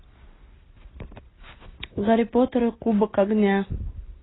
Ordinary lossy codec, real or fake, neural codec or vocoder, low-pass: AAC, 16 kbps; real; none; 7.2 kHz